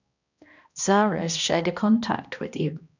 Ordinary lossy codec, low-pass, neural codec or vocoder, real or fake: none; 7.2 kHz; codec, 16 kHz, 1 kbps, X-Codec, HuBERT features, trained on balanced general audio; fake